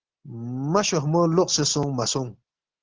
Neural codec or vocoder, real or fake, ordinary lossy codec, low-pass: none; real; Opus, 16 kbps; 7.2 kHz